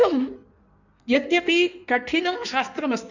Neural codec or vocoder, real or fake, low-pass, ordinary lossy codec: codec, 16 kHz in and 24 kHz out, 1.1 kbps, FireRedTTS-2 codec; fake; 7.2 kHz; none